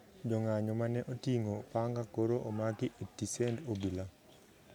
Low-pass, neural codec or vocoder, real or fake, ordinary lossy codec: none; none; real; none